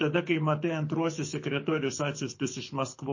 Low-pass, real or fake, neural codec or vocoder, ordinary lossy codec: 7.2 kHz; fake; codec, 24 kHz, 6 kbps, HILCodec; MP3, 32 kbps